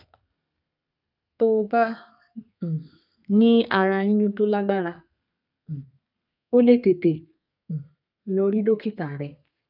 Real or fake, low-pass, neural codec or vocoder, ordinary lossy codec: fake; 5.4 kHz; codec, 32 kHz, 1.9 kbps, SNAC; none